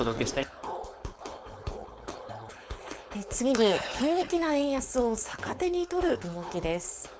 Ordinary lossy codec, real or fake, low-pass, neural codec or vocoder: none; fake; none; codec, 16 kHz, 4.8 kbps, FACodec